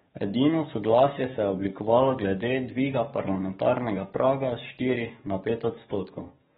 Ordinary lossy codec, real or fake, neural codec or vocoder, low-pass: AAC, 16 kbps; fake; codec, 44.1 kHz, 7.8 kbps, DAC; 19.8 kHz